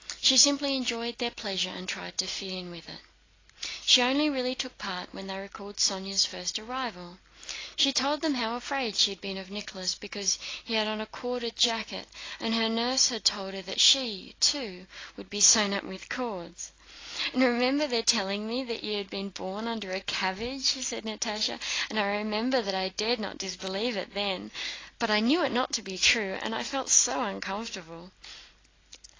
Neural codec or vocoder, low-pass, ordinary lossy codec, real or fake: none; 7.2 kHz; AAC, 32 kbps; real